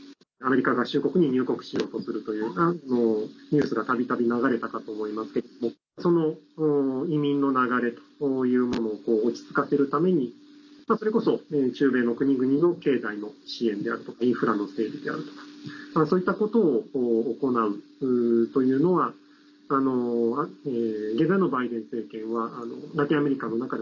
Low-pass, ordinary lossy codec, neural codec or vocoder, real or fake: 7.2 kHz; none; none; real